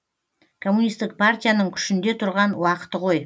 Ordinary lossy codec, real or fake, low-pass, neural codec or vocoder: none; real; none; none